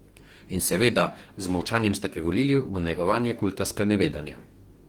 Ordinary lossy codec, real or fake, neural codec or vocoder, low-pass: Opus, 24 kbps; fake; codec, 44.1 kHz, 2.6 kbps, DAC; 19.8 kHz